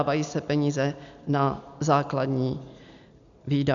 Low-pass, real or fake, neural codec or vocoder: 7.2 kHz; real; none